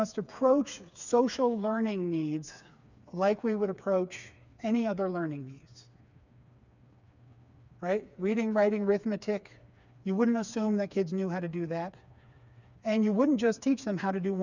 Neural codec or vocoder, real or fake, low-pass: codec, 16 kHz, 4 kbps, FreqCodec, smaller model; fake; 7.2 kHz